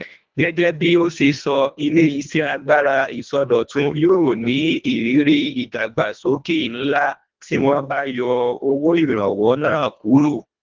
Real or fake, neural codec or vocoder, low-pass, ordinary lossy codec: fake; codec, 24 kHz, 1.5 kbps, HILCodec; 7.2 kHz; Opus, 32 kbps